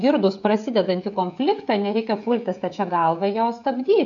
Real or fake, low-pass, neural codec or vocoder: fake; 7.2 kHz; codec, 16 kHz, 16 kbps, FreqCodec, smaller model